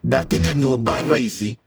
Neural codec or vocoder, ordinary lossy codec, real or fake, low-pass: codec, 44.1 kHz, 0.9 kbps, DAC; none; fake; none